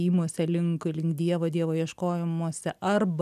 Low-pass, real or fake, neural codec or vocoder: 14.4 kHz; real; none